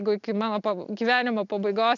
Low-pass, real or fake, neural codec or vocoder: 7.2 kHz; real; none